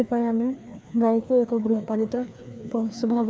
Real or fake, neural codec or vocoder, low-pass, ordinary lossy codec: fake; codec, 16 kHz, 2 kbps, FreqCodec, larger model; none; none